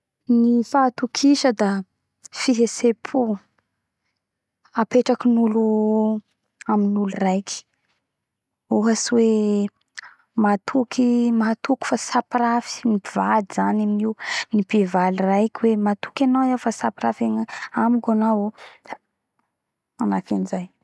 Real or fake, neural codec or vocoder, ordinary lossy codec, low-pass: real; none; none; none